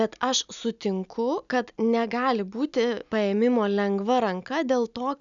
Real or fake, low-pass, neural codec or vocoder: real; 7.2 kHz; none